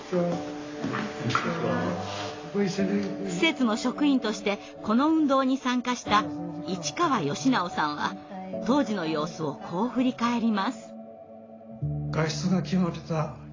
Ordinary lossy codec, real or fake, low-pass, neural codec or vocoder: AAC, 32 kbps; real; 7.2 kHz; none